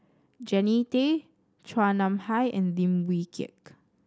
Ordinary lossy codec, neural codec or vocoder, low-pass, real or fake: none; none; none; real